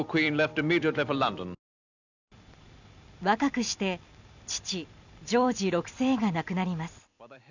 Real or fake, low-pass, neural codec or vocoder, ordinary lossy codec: fake; 7.2 kHz; vocoder, 44.1 kHz, 128 mel bands every 256 samples, BigVGAN v2; none